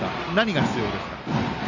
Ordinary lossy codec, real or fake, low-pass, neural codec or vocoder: none; real; 7.2 kHz; none